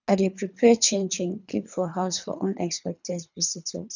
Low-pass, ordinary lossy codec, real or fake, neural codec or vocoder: 7.2 kHz; none; fake; codec, 24 kHz, 3 kbps, HILCodec